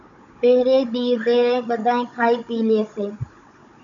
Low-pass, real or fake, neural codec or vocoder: 7.2 kHz; fake; codec, 16 kHz, 16 kbps, FunCodec, trained on Chinese and English, 50 frames a second